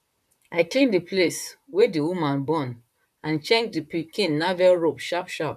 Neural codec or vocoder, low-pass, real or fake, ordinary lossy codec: vocoder, 44.1 kHz, 128 mel bands, Pupu-Vocoder; 14.4 kHz; fake; none